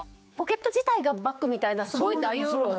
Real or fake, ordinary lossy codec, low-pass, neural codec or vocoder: fake; none; none; codec, 16 kHz, 4 kbps, X-Codec, HuBERT features, trained on general audio